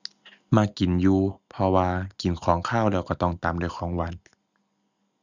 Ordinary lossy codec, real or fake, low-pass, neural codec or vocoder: none; real; 7.2 kHz; none